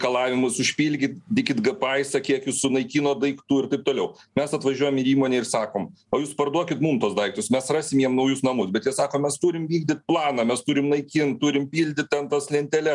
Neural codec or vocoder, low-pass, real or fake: none; 10.8 kHz; real